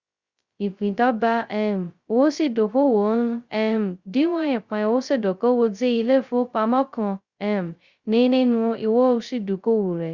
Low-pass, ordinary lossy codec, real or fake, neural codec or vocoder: 7.2 kHz; Opus, 64 kbps; fake; codec, 16 kHz, 0.2 kbps, FocalCodec